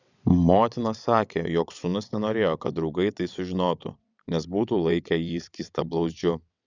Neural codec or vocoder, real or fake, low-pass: vocoder, 22.05 kHz, 80 mel bands, WaveNeXt; fake; 7.2 kHz